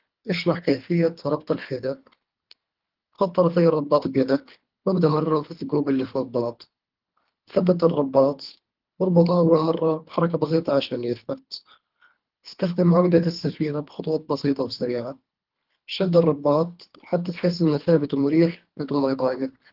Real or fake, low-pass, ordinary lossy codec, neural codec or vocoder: fake; 5.4 kHz; Opus, 32 kbps; codec, 24 kHz, 3 kbps, HILCodec